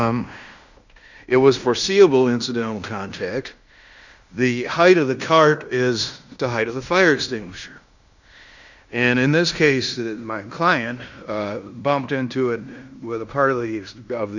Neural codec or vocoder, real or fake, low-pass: codec, 16 kHz in and 24 kHz out, 0.9 kbps, LongCat-Audio-Codec, fine tuned four codebook decoder; fake; 7.2 kHz